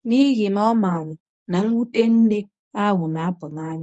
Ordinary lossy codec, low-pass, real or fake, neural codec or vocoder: none; none; fake; codec, 24 kHz, 0.9 kbps, WavTokenizer, medium speech release version 1